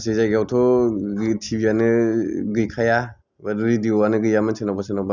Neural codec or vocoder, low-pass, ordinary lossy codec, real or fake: none; 7.2 kHz; none; real